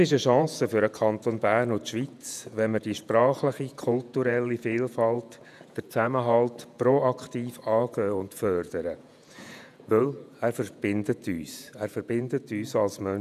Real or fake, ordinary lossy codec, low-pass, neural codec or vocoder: fake; none; 14.4 kHz; vocoder, 48 kHz, 128 mel bands, Vocos